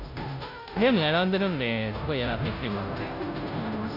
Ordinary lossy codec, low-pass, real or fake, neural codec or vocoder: none; 5.4 kHz; fake; codec, 16 kHz, 0.5 kbps, FunCodec, trained on Chinese and English, 25 frames a second